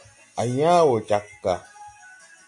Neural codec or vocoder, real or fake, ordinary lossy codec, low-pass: none; real; AAC, 64 kbps; 10.8 kHz